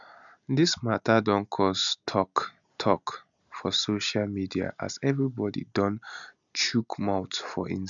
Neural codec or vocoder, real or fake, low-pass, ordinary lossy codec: none; real; 7.2 kHz; none